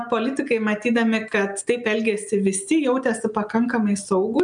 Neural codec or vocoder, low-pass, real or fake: none; 9.9 kHz; real